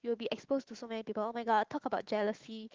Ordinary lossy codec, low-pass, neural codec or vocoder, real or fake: Opus, 16 kbps; 7.2 kHz; autoencoder, 48 kHz, 128 numbers a frame, DAC-VAE, trained on Japanese speech; fake